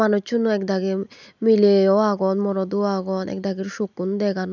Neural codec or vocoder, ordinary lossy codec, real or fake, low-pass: none; none; real; 7.2 kHz